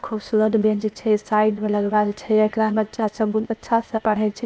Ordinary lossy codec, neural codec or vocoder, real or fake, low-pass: none; codec, 16 kHz, 0.8 kbps, ZipCodec; fake; none